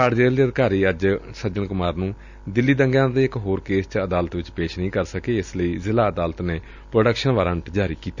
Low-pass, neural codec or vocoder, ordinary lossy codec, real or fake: 7.2 kHz; none; none; real